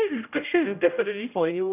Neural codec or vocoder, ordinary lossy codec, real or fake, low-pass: codec, 16 kHz, 0.5 kbps, X-Codec, HuBERT features, trained on general audio; none; fake; 3.6 kHz